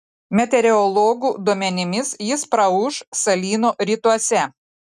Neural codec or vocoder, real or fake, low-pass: none; real; 14.4 kHz